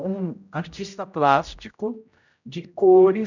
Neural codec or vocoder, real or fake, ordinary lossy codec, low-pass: codec, 16 kHz, 0.5 kbps, X-Codec, HuBERT features, trained on general audio; fake; none; 7.2 kHz